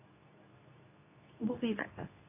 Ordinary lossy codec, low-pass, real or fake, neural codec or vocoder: none; 3.6 kHz; fake; codec, 24 kHz, 0.9 kbps, WavTokenizer, medium speech release version 2